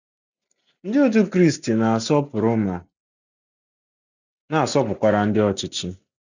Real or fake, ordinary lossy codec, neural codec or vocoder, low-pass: real; none; none; 7.2 kHz